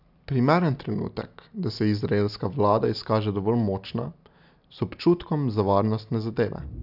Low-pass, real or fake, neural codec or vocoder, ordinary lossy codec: 5.4 kHz; real; none; none